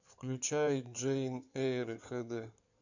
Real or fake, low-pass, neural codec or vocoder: fake; 7.2 kHz; codec, 16 kHz in and 24 kHz out, 2.2 kbps, FireRedTTS-2 codec